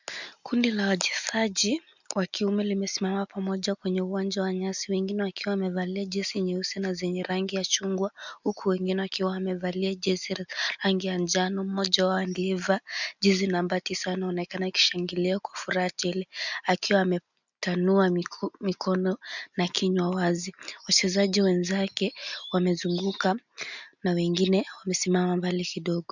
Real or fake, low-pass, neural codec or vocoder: real; 7.2 kHz; none